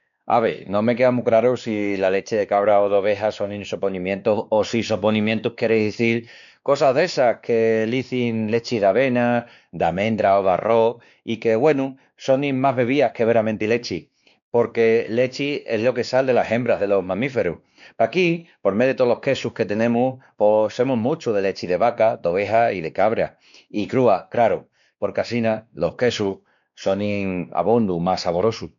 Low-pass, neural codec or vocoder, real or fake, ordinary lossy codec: 7.2 kHz; codec, 16 kHz, 2 kbps, X-Codec, WavLM features, trained on Multilingual LibriSpeech; fake; MP3, 96 kbps